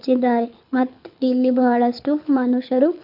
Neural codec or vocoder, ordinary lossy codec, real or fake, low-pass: codec, 24 kHz, 6 kbps, HILCodec; none; fake; 5.4 kHz